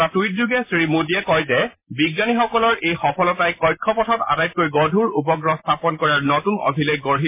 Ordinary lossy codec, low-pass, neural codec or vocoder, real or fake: MP3, 24 kbps; 3.6 kHz; none; real